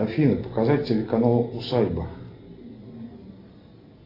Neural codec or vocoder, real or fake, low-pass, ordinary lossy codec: none; real; 5.4 kHz; AAC, 24 kbps